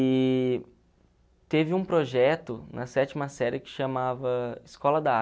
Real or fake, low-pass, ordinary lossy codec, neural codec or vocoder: real; none; none; none